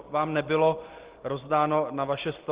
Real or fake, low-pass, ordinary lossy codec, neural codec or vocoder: real; 3.6 kHz; Opus, 16 kbps; none